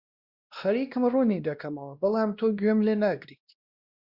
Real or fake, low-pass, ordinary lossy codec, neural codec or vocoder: fake; 5.4 kHz; Opus, 64 kbps; codec, 16 kHz, 1 kbps, X-Codec, WavLM features, trained on Multilingual LibriSpeech